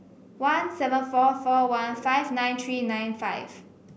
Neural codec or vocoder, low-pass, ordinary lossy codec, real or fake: none; none; none; real